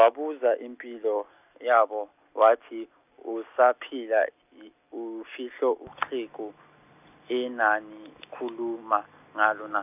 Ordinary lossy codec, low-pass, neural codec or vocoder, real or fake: none; 3.6 kHz; none; real